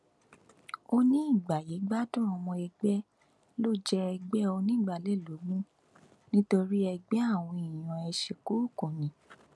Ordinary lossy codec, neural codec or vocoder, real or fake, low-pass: none; none; real; none